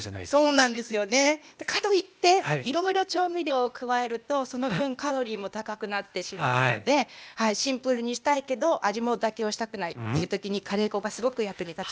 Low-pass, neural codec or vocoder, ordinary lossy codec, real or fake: none; codec, 16 kHz, 0.8 kbps, ZipCodec; none; fake